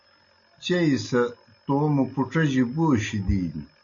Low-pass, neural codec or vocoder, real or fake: 7.2 kHz; none; real